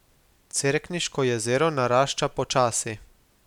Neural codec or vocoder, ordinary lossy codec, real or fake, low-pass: none; none; real; 19.8 kHz